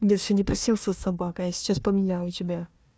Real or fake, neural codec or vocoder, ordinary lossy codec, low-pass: fake; codec, 16 kHz, 1 kbps, FunCodec, trained on Chinese and English, 50 frames a second; none; none